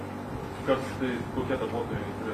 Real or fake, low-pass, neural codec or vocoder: real; 14.4 kHz; none